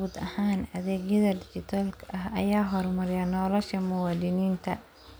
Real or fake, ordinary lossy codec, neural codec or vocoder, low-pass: real; none; none; none